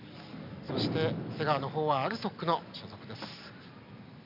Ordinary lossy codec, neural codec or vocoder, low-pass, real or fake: MP3, 48 kbps; none; 5.4 kHz; real